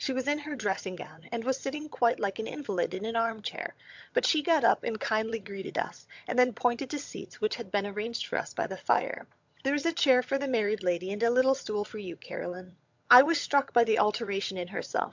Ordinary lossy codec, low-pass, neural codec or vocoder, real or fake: MP3, 64 kbps; 7.2 kHz; vocoder, 22.05 kHz, 80 mel bands, HiFi-GAN; fake